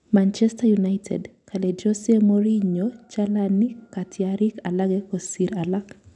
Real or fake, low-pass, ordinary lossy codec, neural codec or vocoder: real; 10.8 kHz; none; none